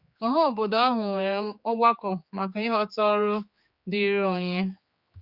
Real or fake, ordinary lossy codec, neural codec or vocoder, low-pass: fake; Opus, 64 kbps; codec, 16 kHz, 4 kbps, X-Codec, HuBERT features, trained on general audio; 5.4 kHz